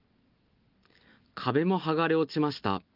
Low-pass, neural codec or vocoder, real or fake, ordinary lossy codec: 5.4 kHz; none; real; Opus, 24 kbps